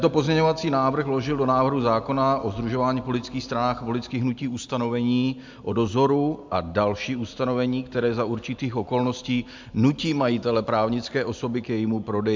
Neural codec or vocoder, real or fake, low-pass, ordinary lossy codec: none; real; 7.2 kHz; MP3, 64 kbps